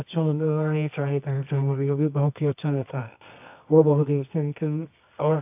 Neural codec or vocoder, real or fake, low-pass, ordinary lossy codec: codec, 24 kHz, 0.9 kbps, WavTokenizer, medium music audio release; fake; 3.6 kHz; AAC, 32 kbps